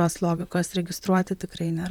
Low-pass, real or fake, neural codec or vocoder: 19.8 kHz; fake; vocoder, 44.1 kHz, 128 mel bands, Pupu-Vocoder